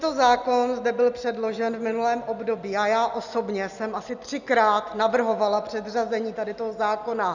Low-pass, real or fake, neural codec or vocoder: 7.2 kHz; real; none